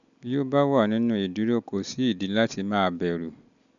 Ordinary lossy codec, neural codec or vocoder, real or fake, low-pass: none; none; real; 7.2 kHz